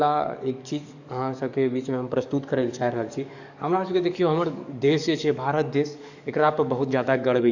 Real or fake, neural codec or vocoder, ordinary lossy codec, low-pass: fake; codec, 44.1 kHz, 7.8 kbps, DAC; none; 7.2 kHz